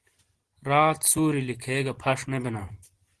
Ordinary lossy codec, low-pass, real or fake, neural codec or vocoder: Opus, 16 kbps; 10.8 kHz; real; none